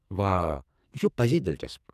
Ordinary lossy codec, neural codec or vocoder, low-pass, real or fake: none; codec, 44.1 kHz, 2.6 kbps, SNAC; 14.4 kHz; fake